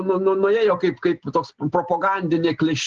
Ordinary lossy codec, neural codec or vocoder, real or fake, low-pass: Opus, 16 kbps; none; real; 7.2 kHz